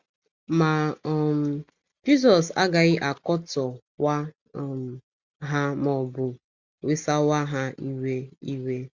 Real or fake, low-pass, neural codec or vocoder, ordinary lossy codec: real; 7.2 kHz; none; Opus, 64 kbps